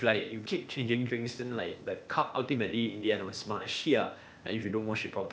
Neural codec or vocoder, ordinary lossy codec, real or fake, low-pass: codec, 16 kHz, 0.8 kbps, ZipCodec; none; fake; none